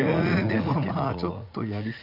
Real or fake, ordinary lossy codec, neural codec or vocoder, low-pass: fake; none; vocoder, 44.1 kHz, 80 mel bands, Vocos; 5.4 kHz